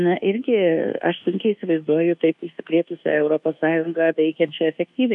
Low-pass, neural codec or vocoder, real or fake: 10.8 kHz; codec, 24 kHz, 1.2 kbps, DualCodec; fake